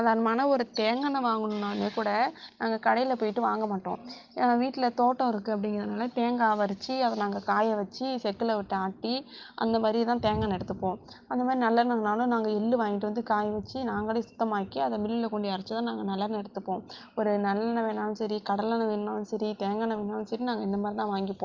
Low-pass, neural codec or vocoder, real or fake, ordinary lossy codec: 7.2 kHz; none; real; Opus, 32 kbps